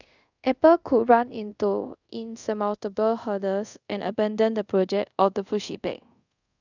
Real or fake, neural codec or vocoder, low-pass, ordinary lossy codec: fake; codec, 24 kHz, 0.5 kbps, DualCodec; 7.2 kHz; none